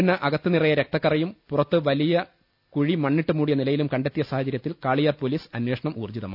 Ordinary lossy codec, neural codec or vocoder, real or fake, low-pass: none; none; real; 5.4 kHz